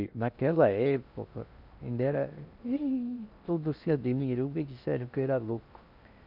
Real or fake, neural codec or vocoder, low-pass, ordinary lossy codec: fake; codec, 16 kHz in and 24 kHz out, 0.6 kbps, FocalCodec, streaming, 2048 codes; 5.4 kHz; none